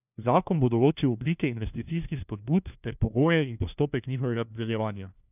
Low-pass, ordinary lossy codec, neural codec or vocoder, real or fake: 3.6 kHz; none; codec, 16 kHz, 1 kbps, FunCodec, trained on LibriTTS, 50 frames a second; fake